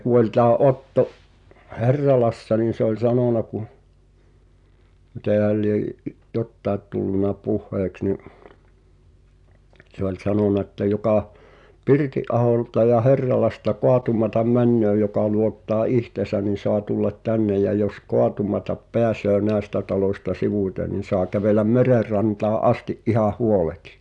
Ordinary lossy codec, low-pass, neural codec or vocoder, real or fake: none; 10.8 kHz; none; real